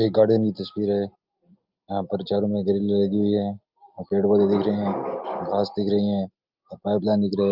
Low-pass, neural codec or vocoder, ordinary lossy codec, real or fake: 5.4 kHz; none; Opus, 24 kbps; real